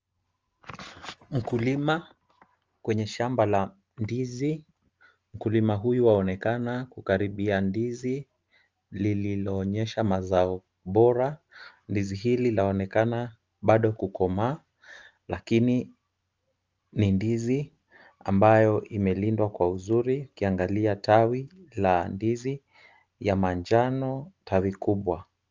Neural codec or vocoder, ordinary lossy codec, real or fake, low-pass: none; Opus, 24 kbps; real; 7.2 kHz